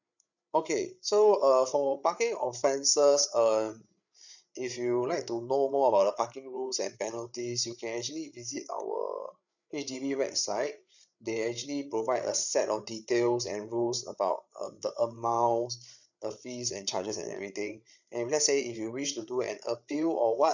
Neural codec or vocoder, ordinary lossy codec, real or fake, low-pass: codec, 16 kHz, 8 kbps, FreqCodec, larger model; none; fake; 7.2 kHz